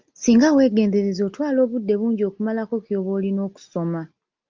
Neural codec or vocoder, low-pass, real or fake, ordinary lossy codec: none; 7.2 kHz; real; Opus, 24 kbps